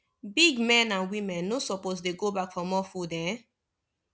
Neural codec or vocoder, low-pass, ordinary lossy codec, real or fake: none; none; none; real